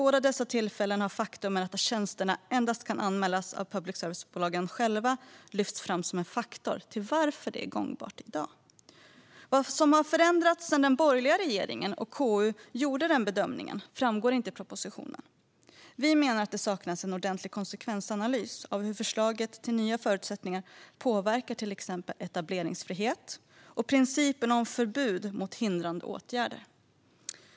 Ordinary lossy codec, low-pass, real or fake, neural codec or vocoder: none; none; real; none